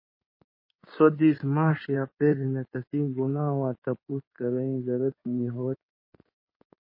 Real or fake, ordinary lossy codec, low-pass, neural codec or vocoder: fake; MP3, 24 kbps; 5.4 kHz; codec, 16 kHz in and 24 kHz out, 2.2 kbps, FireRedTTS-2 codec